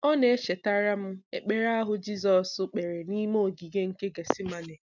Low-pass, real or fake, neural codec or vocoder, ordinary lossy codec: 7.2 kHz; real; none; none